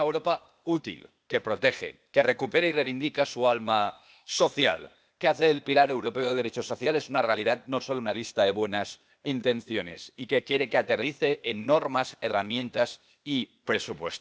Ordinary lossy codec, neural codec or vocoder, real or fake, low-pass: none; codec, 16 kHz, 0.8 kbps, ZipCodec; fake; none